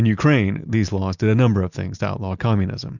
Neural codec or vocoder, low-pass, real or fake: none; 7.2 kHz; real